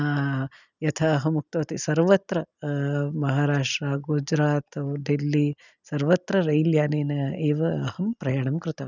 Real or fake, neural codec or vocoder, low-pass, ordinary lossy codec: real; none; 7.2 kHz; none